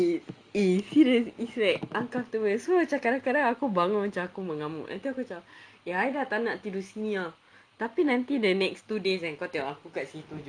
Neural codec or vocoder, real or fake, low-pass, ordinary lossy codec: none; real; 9.9 kHz; Opus, 32 kbps